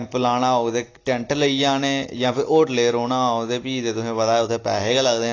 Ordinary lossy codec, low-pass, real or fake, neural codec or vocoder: AAC, 32 kbps; 7.2 kHz; real; none